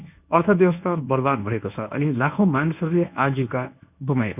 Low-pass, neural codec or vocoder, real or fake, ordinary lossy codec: 3.6 kHz; codec, 24 kHz, 0.9 kbps, WavTokenizer, medium speech release version 1; fake; none